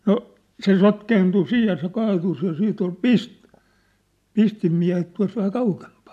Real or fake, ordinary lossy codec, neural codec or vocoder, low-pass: real; AAC, 96 kbps; none; 14.4 kHz